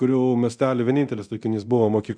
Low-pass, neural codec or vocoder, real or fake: 9.9 kHz; codec, 24 kHz, 0.9 kbps, DualCodec; fake